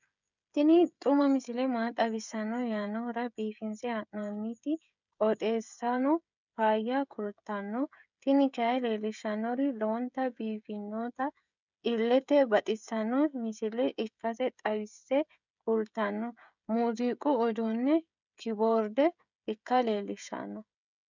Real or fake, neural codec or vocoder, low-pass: fake; codec, 16 kHz, 16 kbps, FreqCodec, smaller model; 7.2 kHz